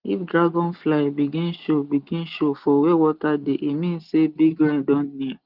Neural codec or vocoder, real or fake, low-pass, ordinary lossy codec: none; real; 5.4 kHz; Opus, 32 kbps